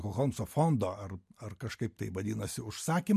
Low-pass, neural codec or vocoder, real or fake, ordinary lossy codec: 14.4 kHz; none; real; MP3, 64 kbps